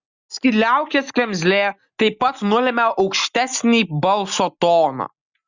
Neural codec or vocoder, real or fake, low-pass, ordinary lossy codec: none; real; 7.2 kHz; Opus, 64 kbps